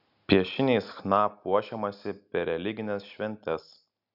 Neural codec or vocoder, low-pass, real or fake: none; 5.4 kHz; real